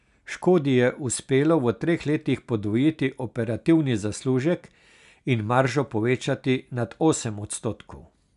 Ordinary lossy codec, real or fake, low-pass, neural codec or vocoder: none; real; 10.8 kHz; none